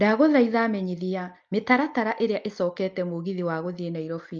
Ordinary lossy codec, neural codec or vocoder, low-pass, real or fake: Opus, 24 kbps; none; 7.2 kHz; real